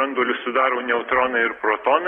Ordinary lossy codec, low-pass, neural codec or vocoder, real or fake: AAC, 32 kbps; 19.8 kHz; none; real